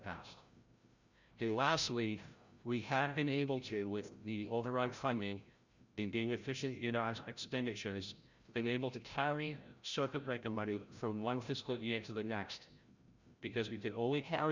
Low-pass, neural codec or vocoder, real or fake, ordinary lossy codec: 7.2 kHz; codec, 16 kHz, 0.5 kbps, FreqCodec, larger model; fake; Opus, 64 kbps